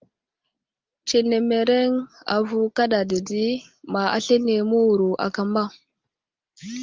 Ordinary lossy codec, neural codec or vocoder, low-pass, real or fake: Opus, 32 kbps; none; 7.2 kHz; real